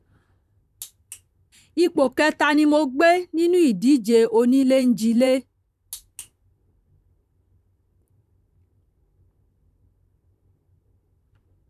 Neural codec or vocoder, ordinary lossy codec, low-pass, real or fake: vocoder, 44.1 kHz, 128 mel bands, Pupu-Vocoder; none; 14.4 kHz; fake